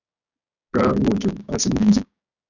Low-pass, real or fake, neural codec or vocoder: 7.2 kHz; fake; codec, 16 kHz, 6 kbps, DAC